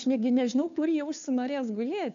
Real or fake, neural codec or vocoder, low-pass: fake; codec, 16 kHz, 2 kbps, FunCodec, trained on Chinese and English, 25 frames a second; 7.2 kHz